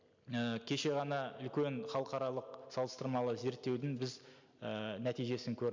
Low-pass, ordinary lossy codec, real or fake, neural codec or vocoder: 7.2 kHz; MP3, 64 kbps; real; none